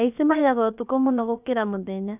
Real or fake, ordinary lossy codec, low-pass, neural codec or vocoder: fake; none; 3.6 kHz; codec, 16 kHz, about 1 kbps, DyCAST, with the encoder's durations